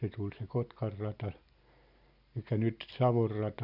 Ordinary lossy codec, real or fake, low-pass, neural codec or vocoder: none; real; 5.4 kHz; none